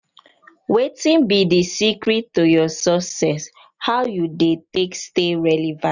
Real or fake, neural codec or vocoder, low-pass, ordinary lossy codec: real; none; 7.2 kHz; none